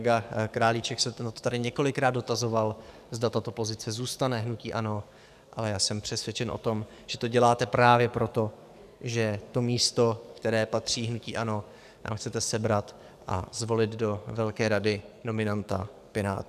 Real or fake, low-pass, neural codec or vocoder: fake; 14.4 kHz; codec, 44.1 kHz, 7.8 kbps, DAC